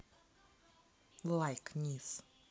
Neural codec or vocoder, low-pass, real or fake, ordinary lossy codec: none; none; real; none